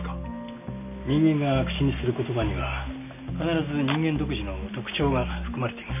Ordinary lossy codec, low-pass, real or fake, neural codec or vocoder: none; 3.6 kHz; real; none